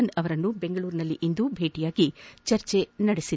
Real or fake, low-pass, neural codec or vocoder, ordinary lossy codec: real; none; none; none